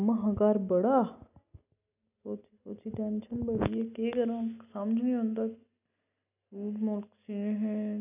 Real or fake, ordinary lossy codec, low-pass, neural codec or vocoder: real; none; 3.6 kHz; none